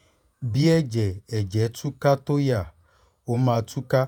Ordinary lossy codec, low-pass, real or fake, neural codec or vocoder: none; 19.8 kHz; fake; vocoder, 48 kHz, 128 mel bands, Vocos